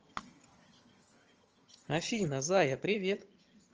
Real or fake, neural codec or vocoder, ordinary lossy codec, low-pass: fake; vocoder, 22.05 kHz, 80 mel bands, HiFi-GAN; Opus, 24 kbps; 7.2 kHz